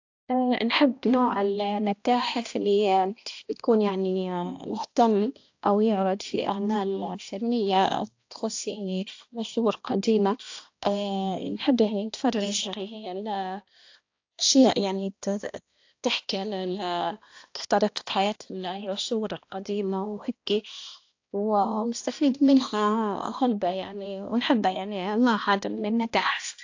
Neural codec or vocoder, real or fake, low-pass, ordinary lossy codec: codec, 16 kHz, 1 kbps, X-Codec, HuBERT features, trained on balanced general audio; fake; 7.2 kHz; AAC, 48 kbps